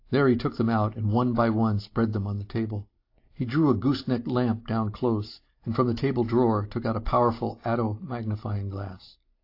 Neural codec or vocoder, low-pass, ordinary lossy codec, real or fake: none; 5.4 kHz; AAC, 32 kbps; real